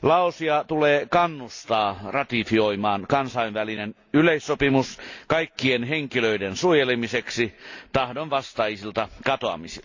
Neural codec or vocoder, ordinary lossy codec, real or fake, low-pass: none; AAC, 48 kbps; real; 7.2 kHz